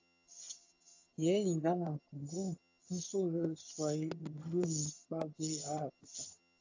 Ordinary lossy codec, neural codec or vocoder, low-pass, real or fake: AAC, 48 kbps; vocoder, 22.05 kHz, 80 mel bands, HiFi-GAN; 7.2 kHz; fake